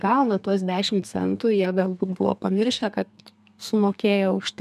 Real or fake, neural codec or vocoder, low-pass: fake; codec, 32 kHz, 1.9 kbps, SNAC; 14.4 kHz